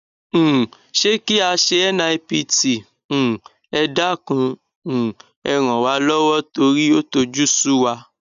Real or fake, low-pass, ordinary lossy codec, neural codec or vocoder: real; 7.2 kHz; none; none